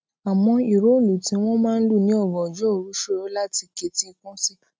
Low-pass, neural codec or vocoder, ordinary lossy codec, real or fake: none; none; none; real